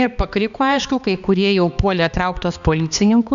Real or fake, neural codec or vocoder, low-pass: fake; codec, 16 kHz, 2 kbps, X-Codec, HuBERT features, trained on balanced general audio; 7.2 kHz